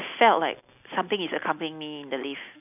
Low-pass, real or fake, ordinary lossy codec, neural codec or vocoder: 3.6 kHz; real; none; none